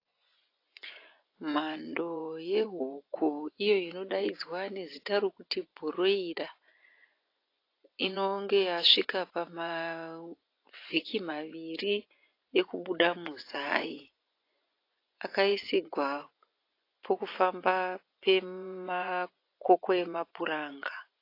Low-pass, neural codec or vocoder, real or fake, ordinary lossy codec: 5.4 kHz; vocoder, 24 kHz, 100 mel bands, Vocos; fake; AAC, 32 kbps